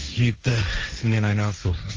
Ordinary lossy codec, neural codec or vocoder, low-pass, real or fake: Opus, 24 kbps; codec, 16 kHz, 1.1 kbps, Voila-Tokenizer; 7.2 kHz; fake